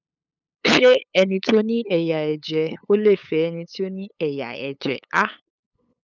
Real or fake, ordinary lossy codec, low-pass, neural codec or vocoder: fake; none; 7.2 kHz; codec, 16 kHz, 8 kbps, FunCodec, trained on LibriTTS, 25 frames a second